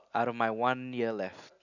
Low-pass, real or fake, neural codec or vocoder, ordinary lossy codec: 7.2 kHz; real; none; none